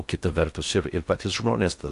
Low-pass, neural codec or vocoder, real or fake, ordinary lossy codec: 10.8 kHz; codec, 16 kHz in and 24 kHz out, 0.6 kbps, FocalCodec, streaming, 2048 codes; fake; AAC, 64 kbps